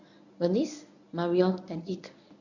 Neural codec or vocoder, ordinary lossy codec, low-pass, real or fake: codec, 24 kHz, 0.9 kbps, WavTokenizer, medium speech release version 1; none; 7.2 kHz; fake